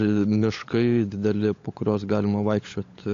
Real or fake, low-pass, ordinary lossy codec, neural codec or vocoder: fake; 7.2 kHz; AAC, 48 kbps; codec, 16 kHz, 16 kbps, FunCodec, trained on LibriTTS, 50 frames a second